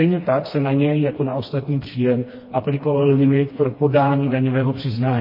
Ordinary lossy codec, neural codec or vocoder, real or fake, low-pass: MP3, 24 kbps; codec, 16 kHz, 2 kbps, FreqCodec, smaller model; fake; 5.4 kHz